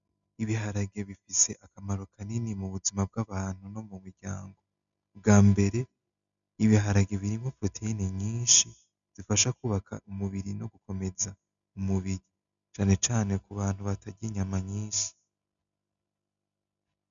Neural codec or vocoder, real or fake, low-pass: none; real; 7.2 kHz